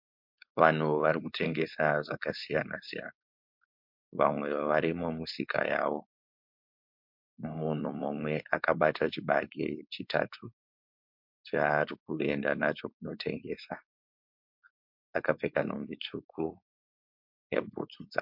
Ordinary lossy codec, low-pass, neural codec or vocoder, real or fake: MP3, 48 kbps; 5.4 kHz; codec, 16 kHz, 4.8 kbps, FACodec; fake